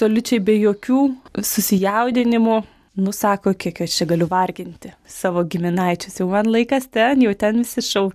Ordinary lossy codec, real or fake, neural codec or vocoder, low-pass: AAC, 96 kbps; real; none; 14.4 kHz